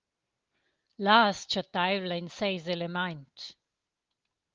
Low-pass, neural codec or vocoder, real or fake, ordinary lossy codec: 7.2 kHz; none; real; Opus, 32 kbps